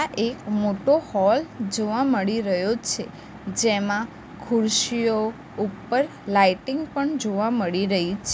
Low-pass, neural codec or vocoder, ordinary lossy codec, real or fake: none; none; none; real